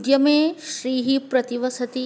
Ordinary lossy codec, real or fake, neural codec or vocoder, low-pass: none; real; none; none